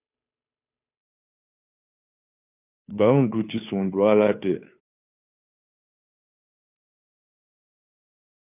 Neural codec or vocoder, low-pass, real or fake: codec, 16 kHz, 2 kbps, FunCodec, trained on Chinese and English, 25 frames a second; 3.6 kHz; fake